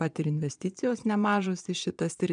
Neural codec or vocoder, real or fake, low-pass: vocoder, 22.05 kHz, 80 mel bands, Vocos; fake; 9.9 kHz